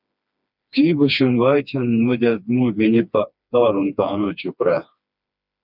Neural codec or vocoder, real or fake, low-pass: codec, 16 kHz, 2 kbps, FreqCodec, smaller model; fake; 5.4 kHz